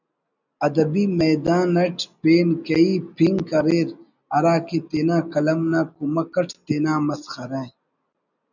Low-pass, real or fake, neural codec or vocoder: 7.2 kHz; real; none